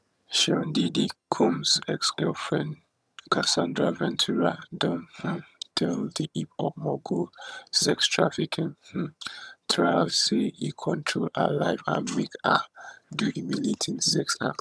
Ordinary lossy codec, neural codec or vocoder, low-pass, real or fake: none; vocoder, 22.05 kHz, 80 mel bands, HiFi-GAN; none; fake